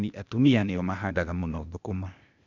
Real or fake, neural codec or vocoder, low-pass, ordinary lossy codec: fake; codec, 16 kHz, 0.8 kbps, ZipCodec; 7.2 kHz; none